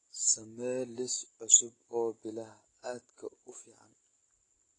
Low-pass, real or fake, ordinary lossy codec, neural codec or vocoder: 10.8 kHz; real; AAC, 32 kbps; none